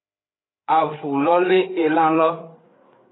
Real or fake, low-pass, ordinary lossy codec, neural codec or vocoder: fake; 7.2 kHz; AAC, 16 kbps; codec, 16 kHz, 4 kbps, FreqCodec, larger model